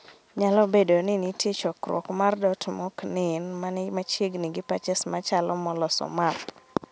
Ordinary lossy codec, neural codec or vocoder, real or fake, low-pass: none; none; real; none